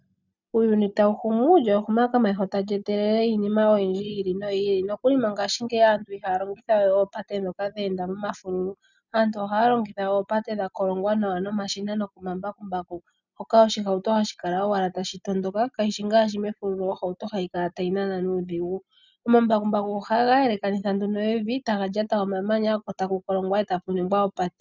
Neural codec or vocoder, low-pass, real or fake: none; 7.2 kHz; real